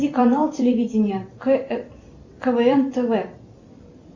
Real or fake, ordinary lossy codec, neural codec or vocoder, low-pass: fake; Opus, 64 kbps; vocoder, 44.1 kHz, 128 mel bands every 256 samples, BigVGAN v2; 7.2 kHz